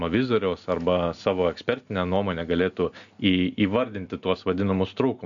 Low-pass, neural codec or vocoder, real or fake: 7.2 kHz; none; real